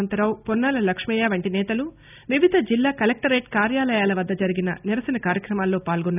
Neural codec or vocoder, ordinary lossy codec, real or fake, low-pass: none; none; real; 3.6 kHz